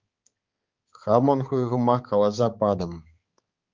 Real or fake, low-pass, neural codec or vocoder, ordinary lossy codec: fake; 7.2 kHz; codec, 16 kHz, 4 kbps, X-Codec, HuBERT features, trained on balanced general audio; Opus, 32 kbps